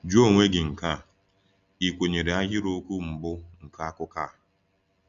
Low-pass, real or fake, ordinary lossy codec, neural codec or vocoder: 7.2 kHz; real; Opus, 64 kbps; none